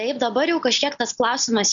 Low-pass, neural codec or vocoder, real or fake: 7.2 kHz; none; real